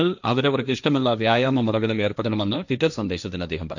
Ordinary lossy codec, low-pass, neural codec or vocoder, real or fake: none; 7.2 kHz; codec, 16 kHz, 1.1 kbps, Voila-Tokenizer; fake